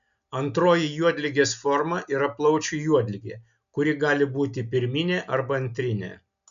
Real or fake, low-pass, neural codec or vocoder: real; 7.2 kHz; none